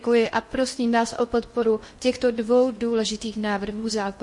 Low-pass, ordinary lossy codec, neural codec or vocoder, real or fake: 10.8 kHz; MP3, 48 kbps; codec, 16 kHz in and 24 kHz out, 0.8 kbps, FocalCodec, streaming, 65536 codes; fake